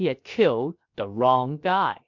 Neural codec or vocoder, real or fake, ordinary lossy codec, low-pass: codec, 16 kHz, 0.7 kbps, FocalCodec; fake; MP3, 48 kbps; 7.2 kHz